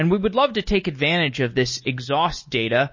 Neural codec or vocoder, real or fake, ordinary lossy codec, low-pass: none; real; MP3, 32 kbps; 7.2 kHz